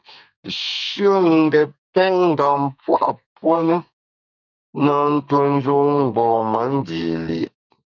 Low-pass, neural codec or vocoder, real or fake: 7.2 kHz; codec, 32 kHz, 1.9 kbps, SNAC; fake